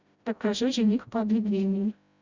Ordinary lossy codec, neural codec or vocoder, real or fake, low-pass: Opus, 64 kbps; codec, 16 kHz, 0.5 kbps, FreqCodec, smaller model; fake; 7.2 kHz